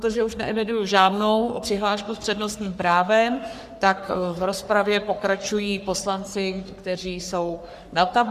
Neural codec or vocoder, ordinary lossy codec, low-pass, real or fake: codec, 44.1 kHz, 3.4 kbps, Pupu-Codec; Opus, 64 kbps; 14.4 kHz; fake